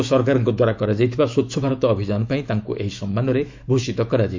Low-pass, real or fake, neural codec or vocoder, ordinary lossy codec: 7.2 kHz; fake; autoencoder, 48 kHz, 128 numbers a frame, DAC-VAE, trained on Japanese speech; MP3, 64 kbps